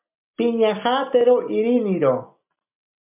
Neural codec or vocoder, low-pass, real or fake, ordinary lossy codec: none; 3.6 kHz; real; MP3, 32 kbps